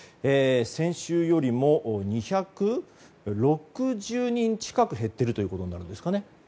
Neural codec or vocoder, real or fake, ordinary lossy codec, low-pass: none; real; none; none